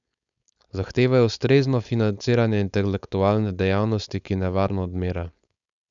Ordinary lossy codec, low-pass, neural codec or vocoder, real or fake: none; 7.2 kHz; codec, 16 kHz, 4.8 kbps, FACodec; fake